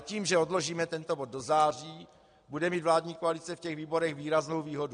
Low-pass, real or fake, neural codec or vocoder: 9.9 kHz; real; none